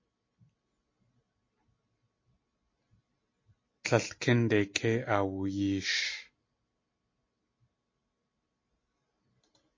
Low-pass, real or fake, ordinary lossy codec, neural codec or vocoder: 7.2 kHz; real; MP3, 32 kbps; none